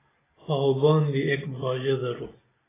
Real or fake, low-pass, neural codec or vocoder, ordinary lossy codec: real; 3.6 kHz; none; AAC, 16 kbps